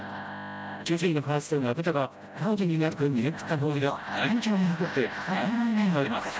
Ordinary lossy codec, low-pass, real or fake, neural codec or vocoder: none; none; fake; codec, 16 kHz, 0.5 kbps, FreqCodec, smaller model